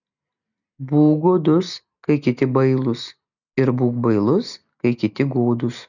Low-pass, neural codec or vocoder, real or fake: 7.2 kHz; none; real